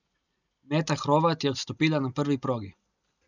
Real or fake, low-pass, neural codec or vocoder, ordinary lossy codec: real; 7.2 kHz; none; none